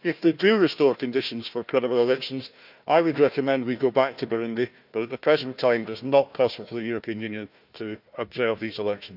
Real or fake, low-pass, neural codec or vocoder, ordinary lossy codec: fake; 5.4 kHz; codec, 16 kHz, 1 kbps, FunCodec, trained on Chinese and English, 50 frames a second; none